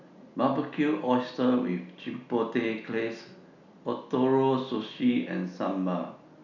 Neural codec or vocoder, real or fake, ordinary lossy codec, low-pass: none; real; none; 7.2 kHz